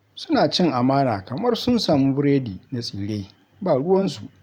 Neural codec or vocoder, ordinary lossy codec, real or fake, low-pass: vocoder, 44.1 kHz, 128 mel bands every 512 samples, BigVGAN v2; none; fake; 19.8 kHz